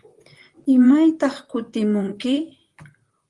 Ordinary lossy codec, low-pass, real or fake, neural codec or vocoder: Opus, 24 kbps; 10.8 kHz; fake; vocoder, 44.1 kHz, 128 mel bands, Pupu-Vocoder